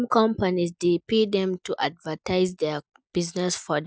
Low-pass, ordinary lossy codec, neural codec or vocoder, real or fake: none; none; none; real